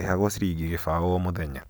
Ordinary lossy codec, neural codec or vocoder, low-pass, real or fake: none; none; none; real